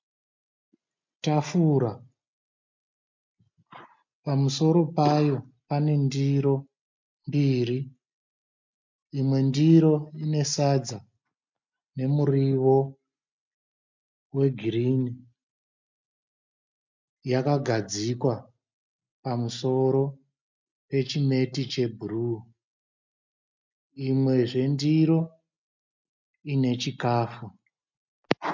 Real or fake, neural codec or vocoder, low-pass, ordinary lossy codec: real; none; 7.2 kHz; MP3, 64 kbps